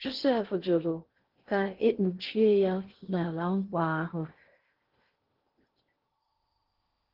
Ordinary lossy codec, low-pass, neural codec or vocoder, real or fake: Opus, 16 kbps; 5.4 kHz; codec, 16 kHz in and 24 kHz out, 0.6 kbps, FocalCodec, streaming, 2048 codes; fake